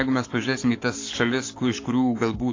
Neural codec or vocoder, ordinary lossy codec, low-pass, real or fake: codec, 44.1 kHz, 7.8 kbps, Pupu-Codec; AAC, 32 kbps; 7.2 kHz; fake